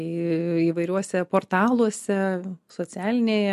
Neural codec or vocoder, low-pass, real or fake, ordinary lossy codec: none; 14.4 kHz; real; MP3, 64 kbps